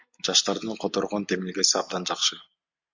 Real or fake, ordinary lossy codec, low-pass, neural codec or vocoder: real; MP3, 64 kbps; 7.2 kHz; none